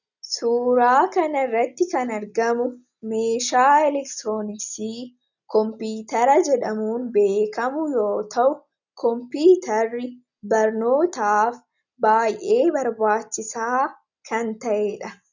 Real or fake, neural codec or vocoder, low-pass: real; none; 7.2 kHz